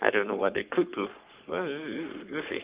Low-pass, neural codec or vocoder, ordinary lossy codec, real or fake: 3.6 kHz; codec, 44.1 kHz, 3.4 kbps, Pupu-Codec; Opus, 32 kbps; fake